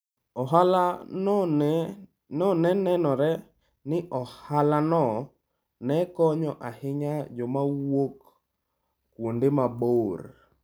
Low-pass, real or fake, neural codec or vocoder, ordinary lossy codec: none; real; none; none